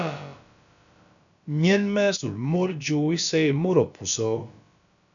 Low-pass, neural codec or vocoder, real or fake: 7.2 kHz; codec, 16 kHz, about 1 kbps, DyCAST, with the encoder's durations; fake